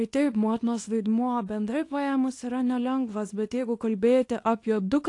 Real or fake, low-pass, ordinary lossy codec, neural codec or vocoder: fake; 10.8 kHz; AAC, 48 kbps; codec, 24 kHz, 0.9 kbps, WavTokenizer, small release